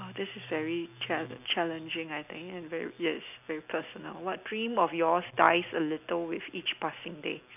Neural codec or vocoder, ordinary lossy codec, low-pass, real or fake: none; none; 3.6 kHz; real